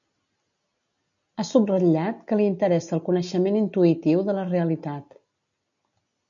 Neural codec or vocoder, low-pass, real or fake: none; 7.2 kHz; real